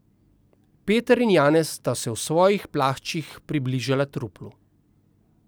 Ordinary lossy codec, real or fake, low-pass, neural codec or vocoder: none; real; none; none